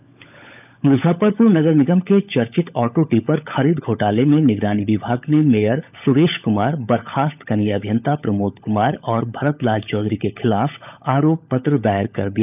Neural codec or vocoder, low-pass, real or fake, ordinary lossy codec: codec, 16 kHz, 16 kbps, FunCodec, trained on LibriTTS, 50 frames a second; 3.6 kHz; fake; none